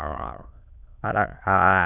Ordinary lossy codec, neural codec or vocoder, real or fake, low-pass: none; autoencoder, 22.05 kHz, a latent of 192 numbers a frame, VITS, trained on many speakers; fake; 3.6 kHz